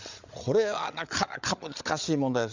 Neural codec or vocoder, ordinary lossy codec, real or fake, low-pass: codec, 16 kHz, 8 kbps, FreqCodec, larger model; Opus, 64 kbps; fake; 7.2 kHz